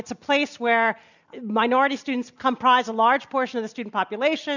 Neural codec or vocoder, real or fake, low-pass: none; real; 7.2 kHz